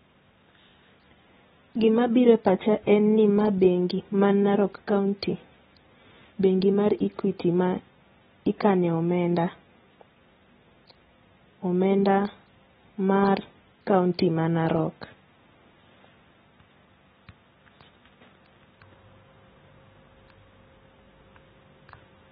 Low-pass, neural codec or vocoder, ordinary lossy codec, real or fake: 14.4 kHz; none; AAC, 16 kbps; real